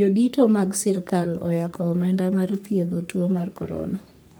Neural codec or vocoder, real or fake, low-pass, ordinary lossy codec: codec, 44.1 kHz, 3.4 kbps, Pupu-Codec; fake; none; none